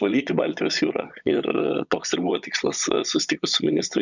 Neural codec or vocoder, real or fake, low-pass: vocoder, 22.05 kHz, 80 mel bands, Vocos; fake; 7.2 kHz